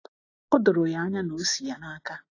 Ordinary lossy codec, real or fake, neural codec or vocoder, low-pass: AAC, 32 kbps; real; none; 7.2 kHz